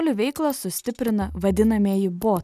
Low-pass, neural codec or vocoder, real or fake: 14.4 kHz; none; real